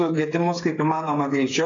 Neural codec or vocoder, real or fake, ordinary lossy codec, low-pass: codec, 16 kHz, 8 kbps, FreqCodec, smaller model; fake; AAC, 32 kbps; 7.2 kHz